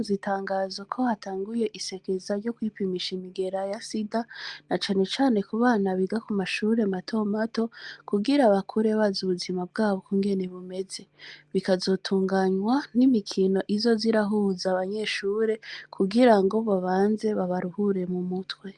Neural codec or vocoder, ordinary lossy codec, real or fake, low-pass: none; Opus, 32 kbps; real; 10.8 kHz